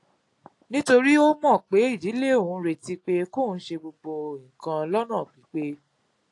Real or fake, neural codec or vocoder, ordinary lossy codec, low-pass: real; none; AAC, 48 kbps; 10.8 kHz